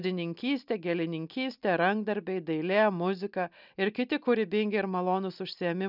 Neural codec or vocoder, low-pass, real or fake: none; 5.4 kHz; real